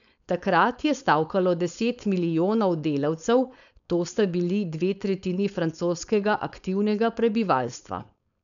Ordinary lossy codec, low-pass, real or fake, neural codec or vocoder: none; 7.2 kHz; fake; codec, 16 kHz, 4.8 kbps, FACodec